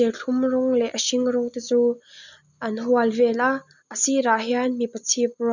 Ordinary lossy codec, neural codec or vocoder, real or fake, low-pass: none; none; real; 7.2 kHz